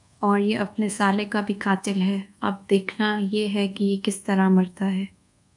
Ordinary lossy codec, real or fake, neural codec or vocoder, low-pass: MP3, 96 kbps; fake; codec, 24 kHz, 1.2 kbps, DualCodec; 10.8 kHz